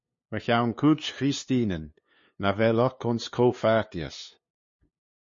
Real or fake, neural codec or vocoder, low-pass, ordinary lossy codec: fake; codec, 16 kHz, 2 kbps, FunCodec, trained on LibriTTS, 25 frames a second; 7.2 kHz; MP3, 32 kbps